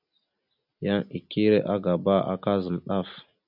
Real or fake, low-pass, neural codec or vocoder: real; 5.4 kHz; none